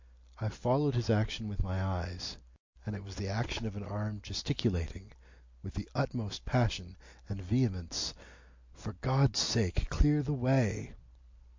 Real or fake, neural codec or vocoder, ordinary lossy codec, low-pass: real; none; MP3, 48 kbps; 7.2 kHz